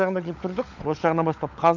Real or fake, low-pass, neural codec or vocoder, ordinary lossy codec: fake; 7.2 kHz; codec, 16 kHz, 16 kbps, FunCodec, trained on LibriTTS, 50 frames a second; none